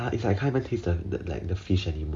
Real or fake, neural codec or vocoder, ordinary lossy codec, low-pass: real; none; none; none